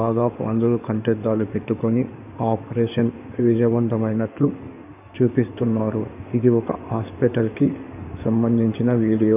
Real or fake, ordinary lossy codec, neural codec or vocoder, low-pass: fake; none; codec, 16 kHz in and 24 kHz out, 2.2 kbps, FireRedTTS-2 codec; 3.6 kHz